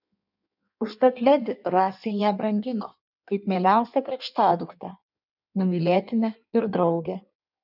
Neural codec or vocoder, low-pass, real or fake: codec, 16 kHz in and 24 kHz out, 1.1 kbps, FireRedTTS-2 codec; 5.4 kHz; fake